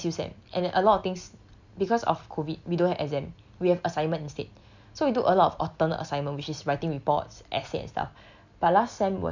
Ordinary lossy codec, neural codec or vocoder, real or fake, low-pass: none; none; real; 7.2 kHz